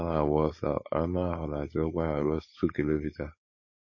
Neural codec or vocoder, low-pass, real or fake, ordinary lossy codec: codec, 16 kHz, 4.8 kbps, FACodec; 7.2 kHz; fake; MP3, 32 kbps